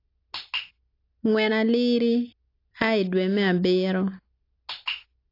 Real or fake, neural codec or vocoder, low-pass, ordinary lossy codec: real; none; 5.4 kHz; AAC, 32 kbps